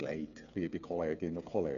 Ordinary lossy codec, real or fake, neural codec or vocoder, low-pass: none; fake; codec, 16 kHz, 8 kbps, FreqCodec, smaller model; 7.2 kHz